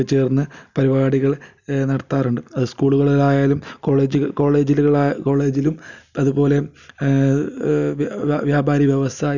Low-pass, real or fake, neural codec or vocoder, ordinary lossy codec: 7.2 kHz; real; none; none